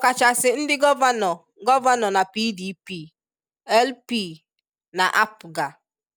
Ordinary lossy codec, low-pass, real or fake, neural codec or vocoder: none; none; real; none